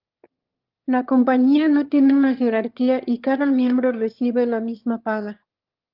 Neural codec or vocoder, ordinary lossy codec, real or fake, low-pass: autoencoder, 22.05 kHz, a latent of 192 numbers a frame, VITS, trained on one speaker; Opus, 32 kbps; fake; 5.4 kHz